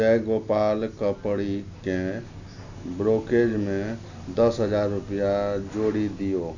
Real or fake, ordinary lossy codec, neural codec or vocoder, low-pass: real; none; none; 7.2 kHz